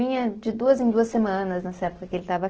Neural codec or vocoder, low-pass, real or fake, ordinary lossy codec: none; 7.2 kHz; real; Opus, 16 kbps